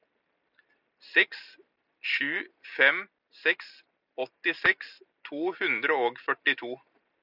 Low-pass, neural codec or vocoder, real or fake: 5.4 kHz; none; real